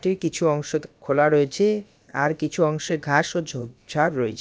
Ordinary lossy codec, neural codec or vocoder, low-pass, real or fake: none; codec, 16 kHz, about 1 kbps, DyCAST, with the encoder's durations; none; fake